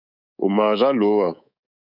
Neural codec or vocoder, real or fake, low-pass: codec, 24 kHz, 3.1 kbps, DualCodec; fake; 5.4 kHz